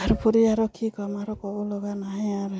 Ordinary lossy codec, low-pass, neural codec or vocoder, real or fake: none; none; none; real